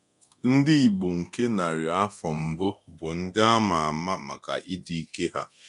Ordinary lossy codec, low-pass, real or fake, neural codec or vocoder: none; 10.8 kHz; fake; codec, 24 kHz, 0.9 kbps, DualCodec